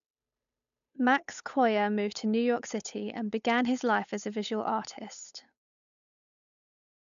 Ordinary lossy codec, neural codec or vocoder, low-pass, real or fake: none; codec, 16 kHz, 8 kbps, FunCodec, trained on Chinese and English, 25 frames a second; 7.2 kHz; fake